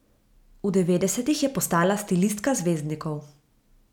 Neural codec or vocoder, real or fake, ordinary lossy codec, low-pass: none; real; none; 19.8 kHz